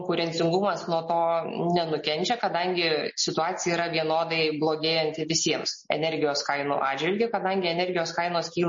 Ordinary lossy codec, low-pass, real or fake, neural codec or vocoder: MP3, 32 kbps; 7.2 kHz; real; none